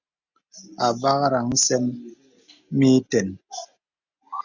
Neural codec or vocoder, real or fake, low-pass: none; real; 7.2 kHz